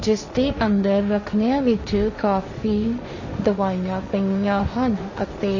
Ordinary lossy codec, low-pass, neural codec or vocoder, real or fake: MP3, 32 kbps; 7.2 kHz; codec, 16 kHz, 1.1 kbps, Voila-Tokenizer; fake